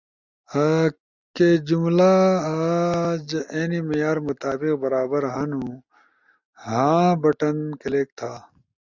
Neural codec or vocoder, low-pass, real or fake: none; 7.2 kHz; real